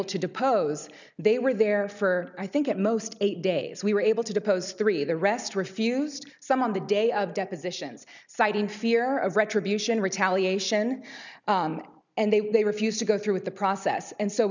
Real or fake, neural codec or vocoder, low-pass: real; none; 7.2 kHz